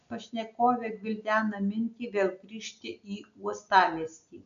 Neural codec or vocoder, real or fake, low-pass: none; real; 7.2 kHz